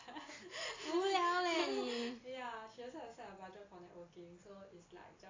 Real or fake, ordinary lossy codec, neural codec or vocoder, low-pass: real; AAC, 32 kbps; none; 7.2 kHz